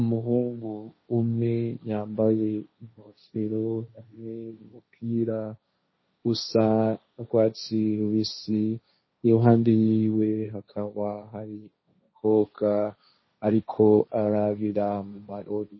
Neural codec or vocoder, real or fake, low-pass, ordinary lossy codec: codec, 16 kHz, 1.1 kbps, Voila-Tokenizer; fake; 7.2 kHz; MP3, 24 kbps